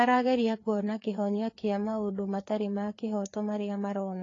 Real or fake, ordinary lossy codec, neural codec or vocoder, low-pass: fake; AAC, 32 kbps; codec, 16 kHz, 4 kbps, FreqCodec, larger model; 7.2 kHz